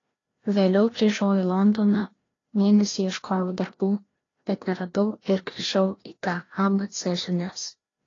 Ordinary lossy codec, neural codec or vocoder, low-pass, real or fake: AAC, 32 kbps; codec, 16 kHz, 1 kbps, FreqCodec, larger model; 7.2 kHz; fake